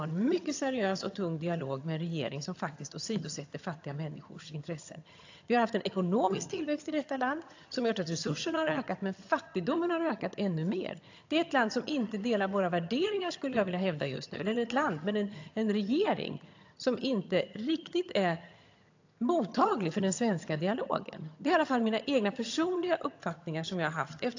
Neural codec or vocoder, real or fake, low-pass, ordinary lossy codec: vocoder, 22.05 kHz, 80 mel bands, HiFi-GAN; fake; 7.2 kHz; AAC, 48 kbps